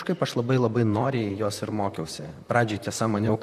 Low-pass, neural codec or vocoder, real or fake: 14.4 kHz; vocoder, 44.1 kHz, 128 mel bands, Pupu-Vocoder; fake